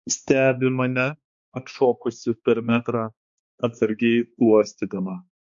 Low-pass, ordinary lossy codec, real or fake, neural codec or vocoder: 7.2 kHz; MP3, 48 kbps; fake; codec, 16 kHz, 2 kbps, X-Codec, HuBERT features, trained on balanced general audio